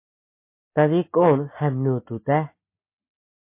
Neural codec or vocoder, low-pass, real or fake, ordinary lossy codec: none; 3.6 kHz; real; MP3, 24 kbps